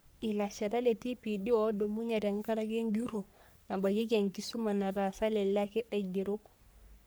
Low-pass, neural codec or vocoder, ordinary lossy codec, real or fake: none; codec, 44.1 kHz, 3.4 kbps, Pupu-Codec; none; fake